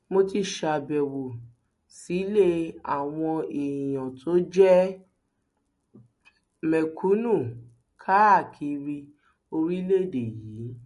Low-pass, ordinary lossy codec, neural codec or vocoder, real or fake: 14.4 kHz; MP3, 48 kbps; none; real